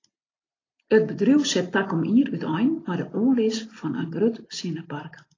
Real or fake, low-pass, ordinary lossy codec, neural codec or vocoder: real; 7.2 kHz; AAC, 32 kbps; none